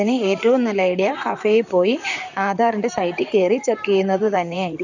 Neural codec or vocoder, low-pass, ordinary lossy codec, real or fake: vocoder, 44.1 kHz, 128 mel bands, Pupu-Vocoder; 7.2 kHz; none; fake